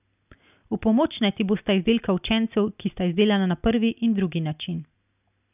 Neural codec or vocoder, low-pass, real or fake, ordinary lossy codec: none; 3.6 kHz; real; none